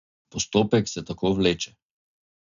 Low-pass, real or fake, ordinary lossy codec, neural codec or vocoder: 7.2 kHz; real; none; none